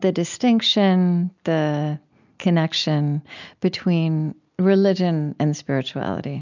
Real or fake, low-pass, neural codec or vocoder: real; 7.2 kHz; none